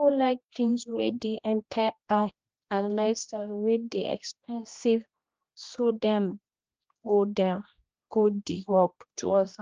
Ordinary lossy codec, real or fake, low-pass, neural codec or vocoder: Opus, 24 kbps; fake; 7.2 kHz; codec, 16 kHz, 1 kbps, X-Codec, HuBERT features, trained on general audio